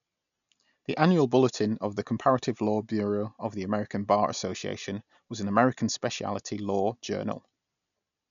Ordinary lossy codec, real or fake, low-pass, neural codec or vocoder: none; real; 7.2 kHz; none